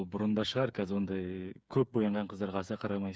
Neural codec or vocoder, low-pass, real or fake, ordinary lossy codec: codec, 16 kHz, 8 kbps, FreqCodec, smaller model; none; fake; none